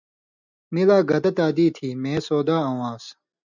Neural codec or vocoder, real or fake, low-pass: none; real; 7.2 kHz